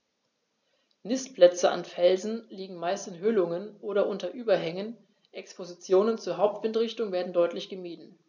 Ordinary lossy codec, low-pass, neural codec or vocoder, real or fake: none; 7.2 kHz; none; real